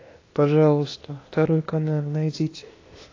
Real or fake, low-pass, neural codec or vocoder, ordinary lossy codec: fake; 7.2 kHz; codec, 16 kHz, 0.8 kbps, ZipCodec; AAC, 32 kbps